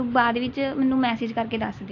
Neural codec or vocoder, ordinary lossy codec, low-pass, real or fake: none; AAC, 48 kbps; 7.2 kHz; real